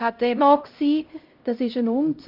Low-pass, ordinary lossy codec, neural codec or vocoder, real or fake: 5.4 kHz; Opus, 24 kbps; codec, 16 kHz, 0.5 kbps, X-Codec, HuBERT features, trained on LibriSpeech; fake